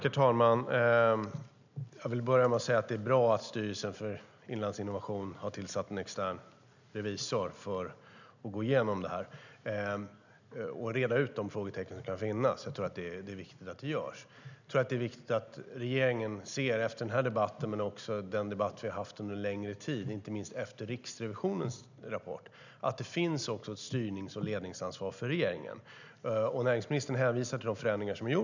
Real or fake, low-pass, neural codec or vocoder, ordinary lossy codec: real; 7.2 kHz; none; none